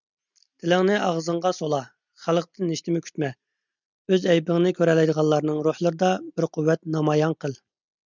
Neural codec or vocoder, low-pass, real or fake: none; 7.2 kHz; real